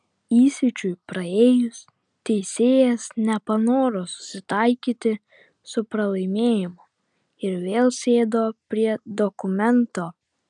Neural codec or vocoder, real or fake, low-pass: none; real; 9.9 kHz